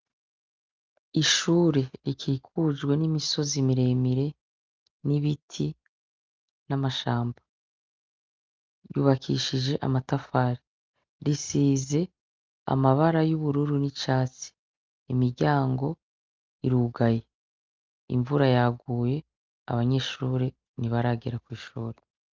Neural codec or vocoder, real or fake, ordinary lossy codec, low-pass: none; real; Opus, 24 kbps; 7.2 kHz